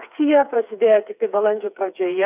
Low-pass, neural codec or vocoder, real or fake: 3.6 kHz; codec, 16 kHz, 4 kbps, FreqCodec, smaller model; fake